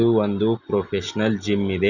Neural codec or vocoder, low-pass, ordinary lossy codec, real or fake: none; 7.2 kHz; none; real